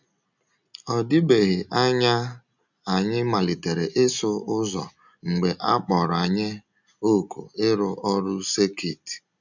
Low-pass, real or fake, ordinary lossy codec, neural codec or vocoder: 7.2 kHz; real; none; none